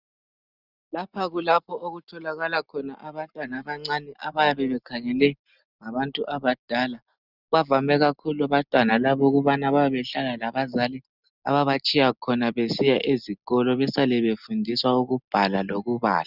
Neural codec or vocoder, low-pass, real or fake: none; 5.4 kHz; real